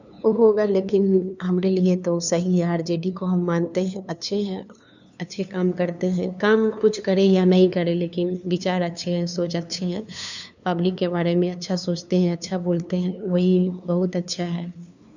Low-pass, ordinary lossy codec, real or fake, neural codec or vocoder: 7.2 kHz; none; fake; codec, 16 kHz, 2 kbps, FunCodec, trained on LibriTTS, 25 frames a second